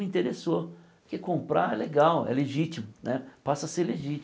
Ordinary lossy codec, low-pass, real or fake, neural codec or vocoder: none; none; real; none